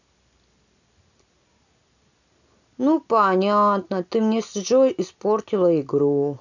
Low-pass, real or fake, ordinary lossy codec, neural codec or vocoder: 7.2 kHz; real; none; none